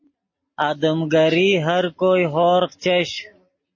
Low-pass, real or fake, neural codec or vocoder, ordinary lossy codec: 7.2 kHz; real; none; MP3, 32 kbps